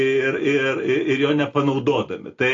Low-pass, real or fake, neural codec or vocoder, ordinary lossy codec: 7.2 kHz; real; none; AAC, 32 kbps